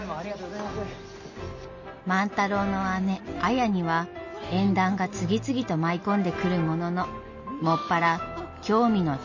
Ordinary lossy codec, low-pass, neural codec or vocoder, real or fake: MP3, 32 kbps; 7.2 kHz; none; real